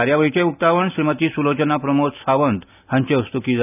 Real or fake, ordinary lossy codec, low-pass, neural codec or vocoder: real; none; 3.6 kHz; none